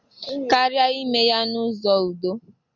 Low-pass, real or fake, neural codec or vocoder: 7.2 kHz; real; none